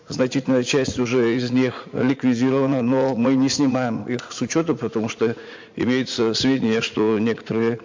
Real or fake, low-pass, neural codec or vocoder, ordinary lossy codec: fake; 7.2 kHz; vocoder, 44.1 kHz, 80 mel bands, Vocos; MP3, 48 kbps